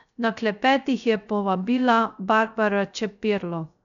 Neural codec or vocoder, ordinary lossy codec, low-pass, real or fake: codec, 16 kHz, 0.3 kbps, FocalCodec; none; 7.2 kHz; fake